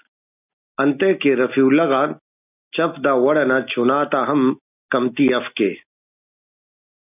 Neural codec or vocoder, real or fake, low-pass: none; real; 3.6 kHz